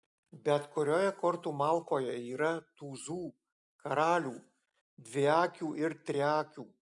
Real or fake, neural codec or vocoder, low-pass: real; none; 10.8 kHz